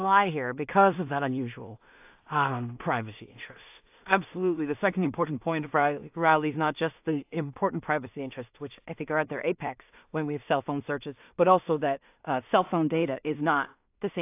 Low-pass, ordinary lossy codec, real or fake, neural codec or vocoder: 3.6 kHz; AAC, 32 kbps; fake; codec, 16 kHz in and 24 kHz out, 0.4 kbps, LongCat-Audio-Codec, two codebook decoder